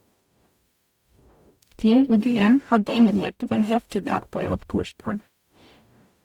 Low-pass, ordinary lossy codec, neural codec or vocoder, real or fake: 19.8 kHz; Opus, 64 kbps; codec, 44.1 kHz, 0.9 kbps, DAC; fake